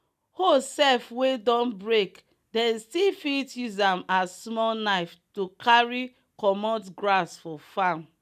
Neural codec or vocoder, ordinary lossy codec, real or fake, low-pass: none; none; real; 14.4 kHz